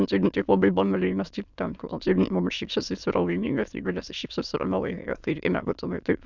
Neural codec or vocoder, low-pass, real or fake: autoencoder, 22.05 kHz, a latent of 192 numbers a frame, VITS, trained on many speakers; 7.2 kHz; fake